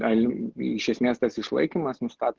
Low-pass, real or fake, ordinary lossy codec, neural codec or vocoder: 7.2 kHz; real; Opus, 16 kbps; none